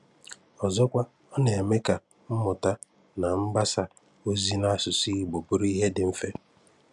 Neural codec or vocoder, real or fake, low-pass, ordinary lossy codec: none; real; 10.8 kHz; none